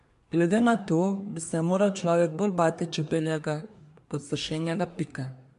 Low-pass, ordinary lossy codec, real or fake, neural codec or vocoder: 10.8 kHz; MP3, 64 kbps; fake; codec, 24 kHz, 1 kbps, SNAC